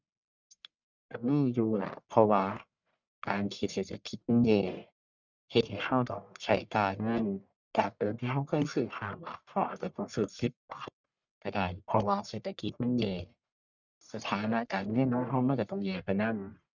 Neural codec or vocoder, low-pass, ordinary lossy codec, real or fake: codec, 44.1 kHz, 1.7 kbps, Pupu-Codec; 7.2 kHz; none; fake